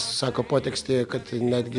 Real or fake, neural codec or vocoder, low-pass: real; none; 10.8 kHz